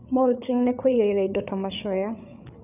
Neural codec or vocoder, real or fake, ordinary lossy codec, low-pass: codec, 16 kHz, 8 kbps, FreqCodec, larger model; fake; none; 3.6 kHz